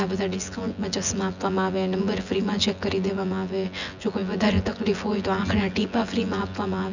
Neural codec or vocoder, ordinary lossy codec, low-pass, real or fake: vocoder, 24 kHz, 100 mel bands, Vocos; none; 7.2 kHz; fake